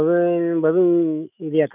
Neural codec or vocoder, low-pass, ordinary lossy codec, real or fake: autoencoder, 48 kHz, 128 numbers a frame, DAC-VAE, trained on Japanese speech; 3.6 kHz; none; fake